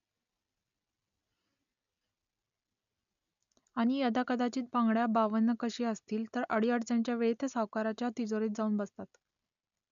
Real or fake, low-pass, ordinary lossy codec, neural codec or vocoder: real; 7.2 kHz; none; none